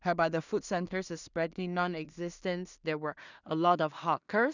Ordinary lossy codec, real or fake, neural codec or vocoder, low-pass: none; fake; codec, 16 kHz in and 24 kHz out, 0.4 kbps, LongCat-Audio-Codec, two codebook decoder; 7.2 kHz